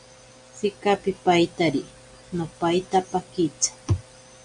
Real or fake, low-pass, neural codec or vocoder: real; 9.9 kHz; none